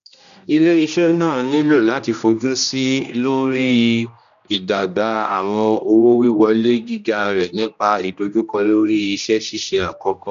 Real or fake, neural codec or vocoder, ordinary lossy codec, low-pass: fake; codec, 16 kHz, 1 kbps, X-Codec, HuBERT features, trained on general audio; none; 7.2 kHz